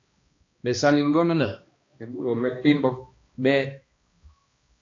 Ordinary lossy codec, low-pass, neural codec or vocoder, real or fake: AAC, 48 kbps; 7.2 kHz; codec, 16 kHz, 1 kbps, X-Codec, HuBERT features, trained on balanced general audio; fake